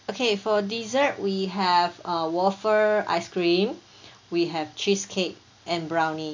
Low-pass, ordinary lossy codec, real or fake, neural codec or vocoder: 7.2 kHz; AAC, 48 kbps; real; none